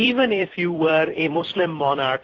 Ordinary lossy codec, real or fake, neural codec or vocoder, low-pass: AAC, 32 kbps; fake; vocoder, 44.1 kHz, 128 mel bands every 256 samples, BigVGAN v2; 7.2 kHz